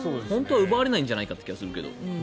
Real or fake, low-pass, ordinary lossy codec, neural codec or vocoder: real; none; none; none